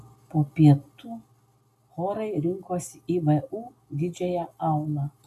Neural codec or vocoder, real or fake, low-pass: none; real; 14.4 kHz